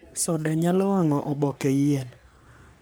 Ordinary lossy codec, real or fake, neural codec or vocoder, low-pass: none; fake; codec, 44.1 kHz, 3.4 kbps, Pupu-Codec; none